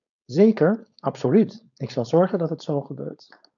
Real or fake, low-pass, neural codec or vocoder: fake; 7.2 kHz; codec, 16 kHz, 4.8 kbps, FACodec